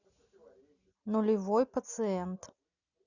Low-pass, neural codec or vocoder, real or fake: 7.2 kHz; none; real